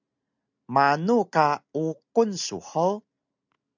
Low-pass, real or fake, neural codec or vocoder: 7.2 kHz; real; none